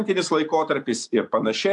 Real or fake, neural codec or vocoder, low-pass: real; none; 10.8 kHz